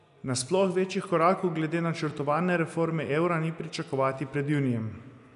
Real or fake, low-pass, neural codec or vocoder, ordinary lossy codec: real; 10.8 kHz; none; none